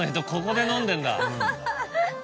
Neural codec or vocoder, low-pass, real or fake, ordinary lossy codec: none; none; real; none